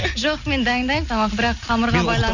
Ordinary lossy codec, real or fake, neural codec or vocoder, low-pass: none; real; none; 7.2 kHz